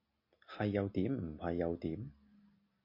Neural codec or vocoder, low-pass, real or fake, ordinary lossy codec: none; 5.4 kHz; real; MP3, 32 kbps